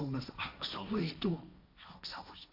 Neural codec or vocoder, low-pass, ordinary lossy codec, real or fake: codec, 16 kHz, 1.1 kbps, Voila-Tokenizer; 5.4 kHz; AAC, 32 kbps; fake